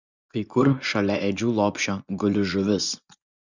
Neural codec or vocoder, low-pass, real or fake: vocoder, 24 kHz, 100 mel bands, Vocos; 7.2 kHz; fake